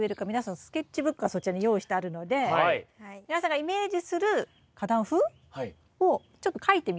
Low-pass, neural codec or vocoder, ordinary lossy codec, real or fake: none; none; none; real